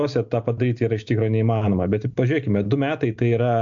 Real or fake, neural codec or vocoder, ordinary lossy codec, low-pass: real; none; AAC, 64 kbps; 7.2 kHz